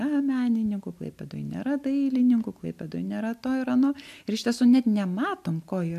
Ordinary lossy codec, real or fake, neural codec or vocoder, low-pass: AAC, 96 kbps; real; none; 14.4 kHz